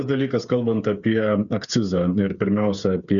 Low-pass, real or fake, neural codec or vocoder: 7.2 kHz; fake; codec, 16 kHz, 8 kbps, FreqCodec, smaller model